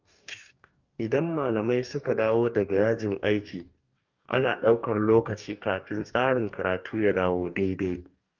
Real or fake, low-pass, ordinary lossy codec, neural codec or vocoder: fake; 7.2 kHz; Opus, 24 kbps; codec, 44.1 kHz, 2.6 kbps, DAC